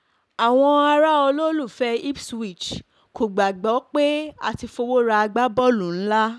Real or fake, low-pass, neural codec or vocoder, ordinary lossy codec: real; none; none; none